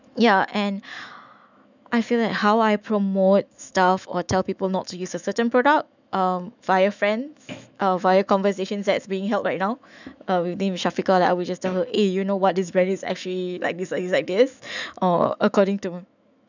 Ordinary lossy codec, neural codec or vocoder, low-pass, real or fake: none; autoencoder, 48 kHz, 128 numbers a frame, DAC-VAE, trained on Japanese speech; 7.2 kHz; fake